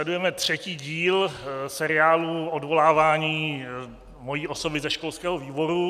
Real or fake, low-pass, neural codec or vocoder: real; 14.4 kHz; none